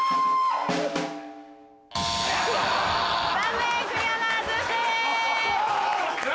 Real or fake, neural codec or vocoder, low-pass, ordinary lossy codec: real; none; none; none